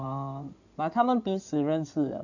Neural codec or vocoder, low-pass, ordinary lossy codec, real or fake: codec, 16 kHz in and 24 kHz out, 2.2 kbps, FireRedTTS-2 codec; 7.2 kHz; none; fake